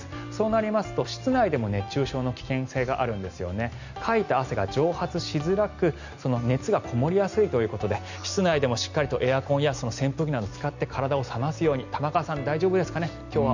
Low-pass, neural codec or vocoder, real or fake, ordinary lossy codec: 7.2 kHz; none; real; none